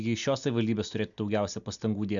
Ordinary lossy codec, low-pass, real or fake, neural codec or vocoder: MP3, 96 kbps; 7.2 kHz; real; none